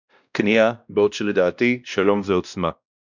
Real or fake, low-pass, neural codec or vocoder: fake; 7.2 kHz; codec, 16 kHz, 1 kbps, X-Codec, WavLM features, trained on Multilingual LibriSpeech